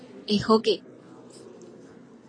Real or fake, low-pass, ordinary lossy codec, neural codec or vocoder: real; 9.9 kHz; AAC, 32 kbps; none